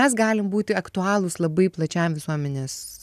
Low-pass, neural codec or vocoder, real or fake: 14.4 kHz; none; real